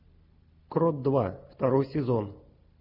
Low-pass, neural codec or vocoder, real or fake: 5.4 kHz; none; real